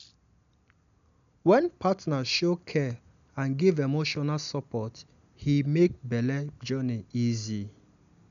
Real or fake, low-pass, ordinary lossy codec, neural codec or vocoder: real; 7.2 kHz; none; none